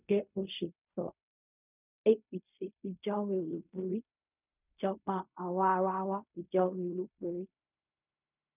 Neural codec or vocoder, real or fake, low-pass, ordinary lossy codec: codec, 16 kHz in and 24 kHz out, 0.4 kbps, LongCat-Audio-Codec, fine tuned four codebook decoder; fake; 3.6 kHz; none